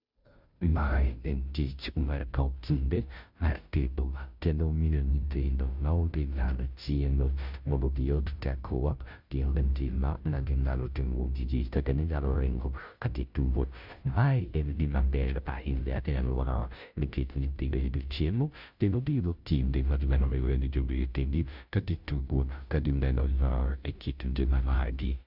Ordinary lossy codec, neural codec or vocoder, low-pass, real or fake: none; codec, 16 kHz, 0.5 kbps, FunCodec, trained on Chinese and English, 25 frames a second; 5.4 kHz; fake